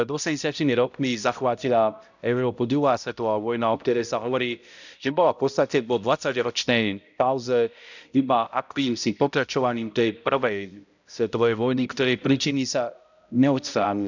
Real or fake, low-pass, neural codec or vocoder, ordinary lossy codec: fake; 7.2 kHz; codec, 16 kHz, 0.5 kbps, X-Codec, HuBERT features, trained on balanced general audio; none